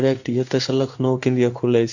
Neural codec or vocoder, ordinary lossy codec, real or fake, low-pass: codec, 16 kHz in and 24 kHz out, 1 kbps, XY-Tokenizer; none; fake; 7.2 kHz